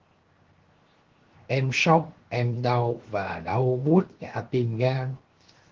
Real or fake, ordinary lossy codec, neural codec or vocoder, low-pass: fake; Opus, 16 kbps; codec, 16 kHz, 0.7 kbps, FocalCodec; 7.2 kHz